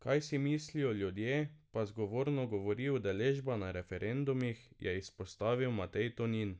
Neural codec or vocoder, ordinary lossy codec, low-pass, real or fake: none; none; none; real